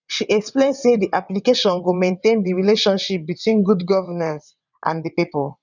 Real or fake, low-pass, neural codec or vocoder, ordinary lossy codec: fake; 7.2 kHz; vocoder, 22.05 kHz, 80 mel bands, WaveNeXt; none